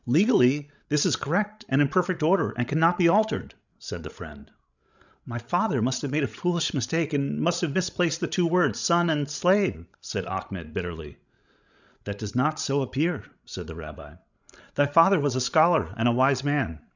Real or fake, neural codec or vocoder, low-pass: fake; codec, 16 kHz, 16 kbps, FreqCodec, larger model; 7.2 kHz